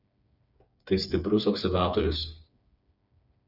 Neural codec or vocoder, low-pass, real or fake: codec, 16 kHz, 4 kbps, FreqCodec, smaller model; 5.4 kHz; fake